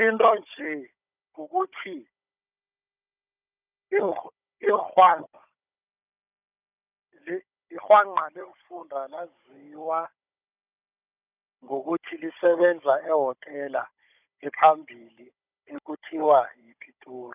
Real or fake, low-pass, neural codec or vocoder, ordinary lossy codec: fake; 3.6 kHz; codec, 16 kHz, 16 kbps, FunCodec, trained on Chinese and English, 50 frames a second; none